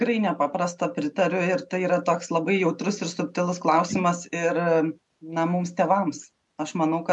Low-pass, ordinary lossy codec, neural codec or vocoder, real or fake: 10.8 kHz; MP3, 64 kbps; none; real